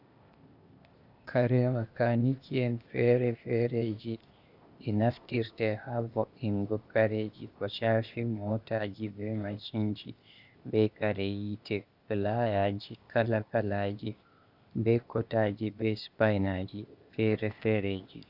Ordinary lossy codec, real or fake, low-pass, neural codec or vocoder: Opus, 64 kbps; fake; 5.4 kHz; codec, 16 kHz, 0.8 kbps, ZipCodec